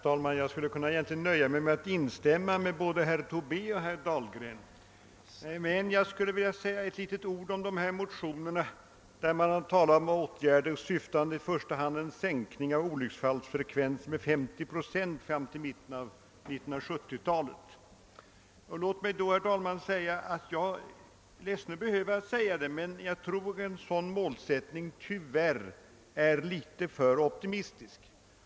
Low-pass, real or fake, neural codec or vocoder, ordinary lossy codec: none; real; none; none